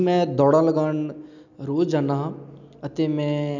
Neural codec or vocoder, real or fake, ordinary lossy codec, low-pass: none; real; none; 7.2 kHz